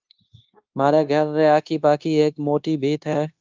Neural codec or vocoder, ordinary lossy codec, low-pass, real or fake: codec, 16 kHz, 0.9 kbps, LongCat-Audio-Codec; Opus, 24 kbps; 7.2 kHz; fake